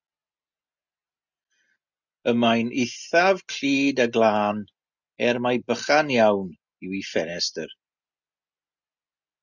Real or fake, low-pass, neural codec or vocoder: real; 7.2 kHz; none